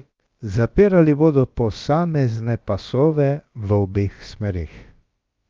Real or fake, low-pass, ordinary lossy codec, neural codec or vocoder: fake; 7.2 kHz; Opus, 32 kbps; codec, 16 kHz, about 1 kbps, DyCAST, with the encoder's durations